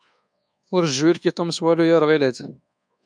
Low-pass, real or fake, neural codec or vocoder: 9.9 kHz; fake; codec, 24 kHz, 1.2 kbps, DualCodec